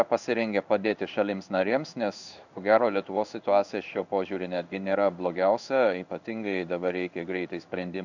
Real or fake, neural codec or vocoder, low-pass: fake; codec, 16 kHz in and 24 kHz out, 1 kbps, XY-Tokenizer; 7.2 kHz